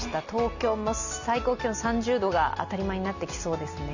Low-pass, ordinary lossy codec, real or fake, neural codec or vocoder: 7.2 kHz; none; real; none